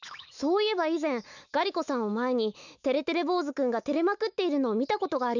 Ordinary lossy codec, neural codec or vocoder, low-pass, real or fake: none; autoencoder, 48 kHz, 128 numbers a frame, DAC-VAE, trained on Japanese speech; 7.2 kHz; fake